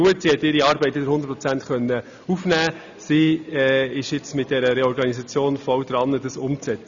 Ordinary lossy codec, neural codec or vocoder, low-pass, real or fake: none; none; 7.2 kHz; real